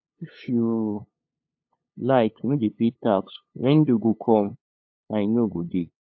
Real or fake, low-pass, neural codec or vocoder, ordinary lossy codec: fake; 7.2 kHz; codec, 16 kHz, 2 kbps, FunCodec, trained on LibriTTS, 25 frames a second; AAC, 48 kbps